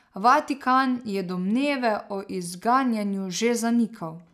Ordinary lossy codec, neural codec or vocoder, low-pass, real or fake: none; none; 14.4 kHz; real